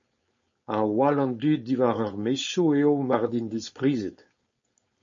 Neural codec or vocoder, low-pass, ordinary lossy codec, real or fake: codec, 16 kHz, 4.8 kbps, FACodec; 7.2 kHz; MP3, 32 kbps; fake